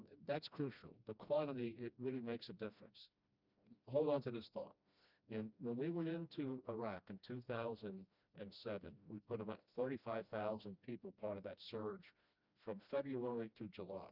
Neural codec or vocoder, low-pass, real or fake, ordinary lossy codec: codec, 16 kHz, 1 kbps, FreqCodec, smaller model; 5.4 kHz; fake; Opus, 64 kbps